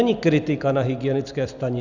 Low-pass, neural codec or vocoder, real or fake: 7.2 kHz; none; real